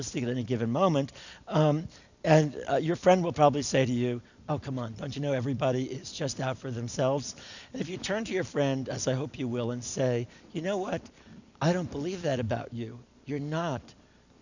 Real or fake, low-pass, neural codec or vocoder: real; 7.2 kHz; none